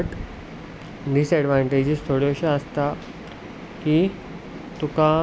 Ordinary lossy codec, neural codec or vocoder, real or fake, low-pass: none; none; real; none